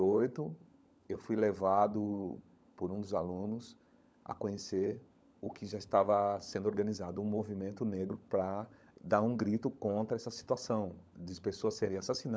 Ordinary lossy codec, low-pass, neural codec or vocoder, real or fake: none; none; codec, 16 kHz, 16 kbps, FunCodec, trained on LibriTTS, 50 frames a second; fake